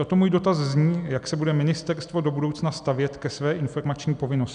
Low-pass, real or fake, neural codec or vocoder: 9.9 kHz; real; none